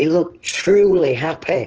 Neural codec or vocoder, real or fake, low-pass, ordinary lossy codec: codec, 16 kHz in and 24 kHz out, 2.2 kbps, FireRedTTS-2 codec; fake; 7.2 kHz; Opus, 16 kbps